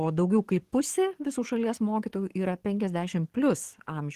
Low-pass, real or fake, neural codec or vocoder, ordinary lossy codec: 14.4 kHz; fake; codec, 44.1 kHz, 7.8 kbps, DAC; Opus, 16 kbps